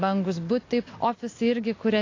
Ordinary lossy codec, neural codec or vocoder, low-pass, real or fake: AAC, 32 kbps; none; 7.2 kHz; real